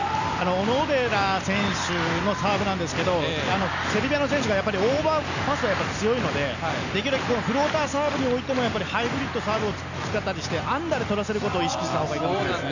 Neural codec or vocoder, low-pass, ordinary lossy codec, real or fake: none; 7.2 kHz; none; real